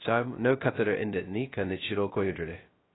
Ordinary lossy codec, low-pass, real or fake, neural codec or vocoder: AAC, 16 kbps; 7.2 kHz; fake; codec, 16 kHz, 0.2 kbps, FocalCodec